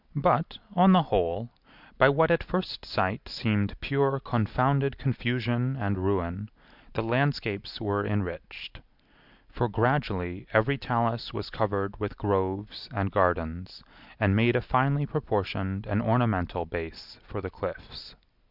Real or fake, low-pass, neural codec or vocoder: real; 5.4 kHz; none